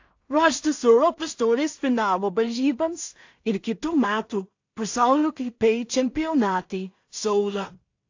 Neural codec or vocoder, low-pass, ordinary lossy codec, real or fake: codec, 16 kHz in and 24 kHz out, 0.4 kbps, LongCat-Audio-Codec, two codebook decoder; 7.2 kHz; AAC, 48 kbps; fake